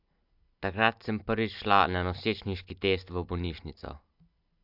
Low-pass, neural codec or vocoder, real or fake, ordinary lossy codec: 5.4 kHz; none; real; AAC, 48 kbps